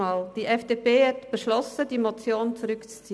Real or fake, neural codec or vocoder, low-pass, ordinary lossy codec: real; none; none; none